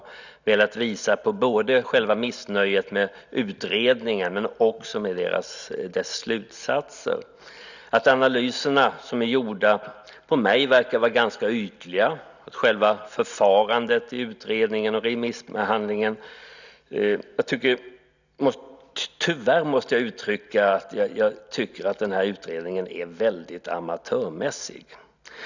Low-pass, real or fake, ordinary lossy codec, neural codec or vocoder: 7.2 kHz; real; none; none